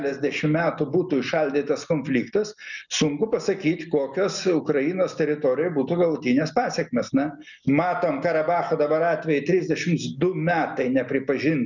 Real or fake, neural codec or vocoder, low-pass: real; none; 7.2 kHz